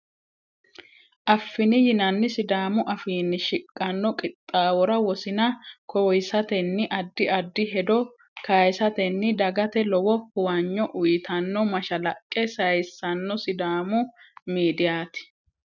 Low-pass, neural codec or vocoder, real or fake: 7.2 kHz; none; real